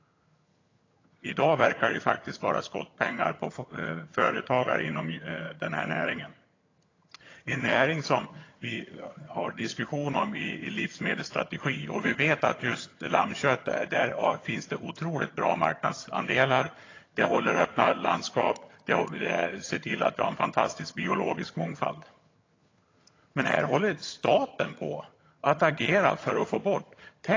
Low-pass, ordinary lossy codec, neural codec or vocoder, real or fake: 7.2 kHz; AAC, 32 kbps; vocoder, 22.05 kHz, 80 mel bands, HiFi-GAN; fake